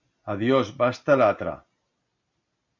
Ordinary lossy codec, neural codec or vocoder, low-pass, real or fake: MP3, 64 kbps; none; 7.2 kHz; real